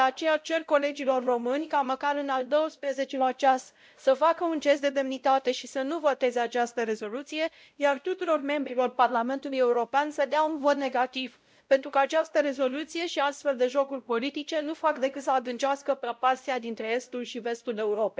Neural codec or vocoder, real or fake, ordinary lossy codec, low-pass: codec, 16 kHz, 0.5 kbps, X-Codec, WavLM features, trained on Multilingual LibriSpeech; fake; none; none